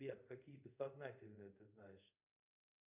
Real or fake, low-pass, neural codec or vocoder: fake; 3.6 kHz; codec, 24 kHz, 0.5 kbps, DualCodec